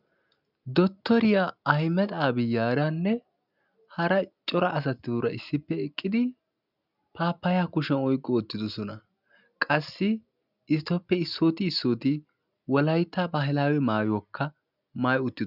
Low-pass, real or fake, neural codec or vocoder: 5.4 kHz; real; none